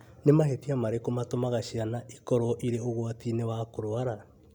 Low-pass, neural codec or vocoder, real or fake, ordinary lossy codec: 19.8 kHz; none; real; none